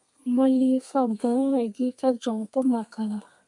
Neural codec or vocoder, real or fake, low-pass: codec, 32 kHz, 1.9 kbps, SNAC; fake; 10.8 kHz